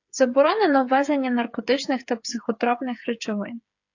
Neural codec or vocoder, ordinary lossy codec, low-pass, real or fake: codec, 16 kHz, 8 kbps, FreqCodec, smaller model; AAC, 48 kbps; 7.2 kHz; fake